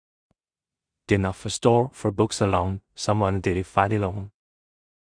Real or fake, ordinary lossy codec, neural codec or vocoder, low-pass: fake; Opus, 64 kbps; codec, 16 kHz in and 24 kHz out, 0.4 kbps, LongCat-Audio-Codec, two codebook decoder; 9.9 kHz